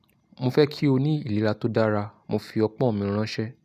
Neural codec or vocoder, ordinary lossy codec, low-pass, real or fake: none; none; 14.4 kHz; real